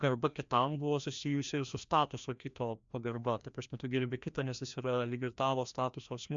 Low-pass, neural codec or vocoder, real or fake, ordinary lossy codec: 7.2 kHz; codec, 16 kHz, 1 kbps, FreqCodec, larger model; fake; MP3, 64 kbps